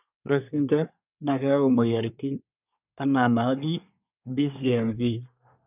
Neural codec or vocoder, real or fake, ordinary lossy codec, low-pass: codec, 24 kHz, 1 kbps, SNAC; fake; none; 3.6 kHz